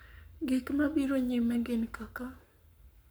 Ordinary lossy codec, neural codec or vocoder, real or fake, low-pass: none; codec, 44.1 kHz, 7.8 kbps, Pupu-Codec; fake; none